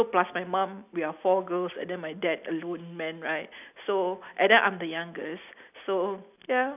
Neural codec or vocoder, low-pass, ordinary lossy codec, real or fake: none; 3.6 kHz; none; real